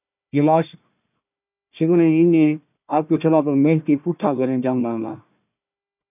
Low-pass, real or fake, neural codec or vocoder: 3.6 kHz; fake; codec, 16 kHz, 1 kbps, FunCodec, trained on Chinese and English, 50 frames a second